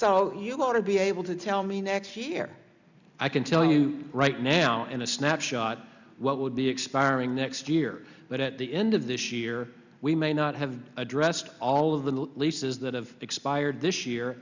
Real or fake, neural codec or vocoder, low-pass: real; none; 7.2 kHz